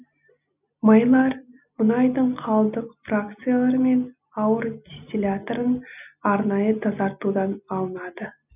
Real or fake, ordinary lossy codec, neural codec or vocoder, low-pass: real; none; none; 3.6 kHz